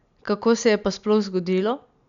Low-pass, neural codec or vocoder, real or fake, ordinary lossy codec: 7.2 kHz; none; real; MP3, 96 kbps